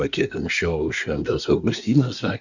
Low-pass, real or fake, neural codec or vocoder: 7.2 kHz; fake; codec, 24 kHz, 1 kbps, SNAC